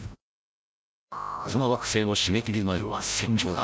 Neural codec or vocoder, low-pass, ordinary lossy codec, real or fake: codec, 16 kHz, 0.5 kbps, FreqCodec, larger model; none; none; fake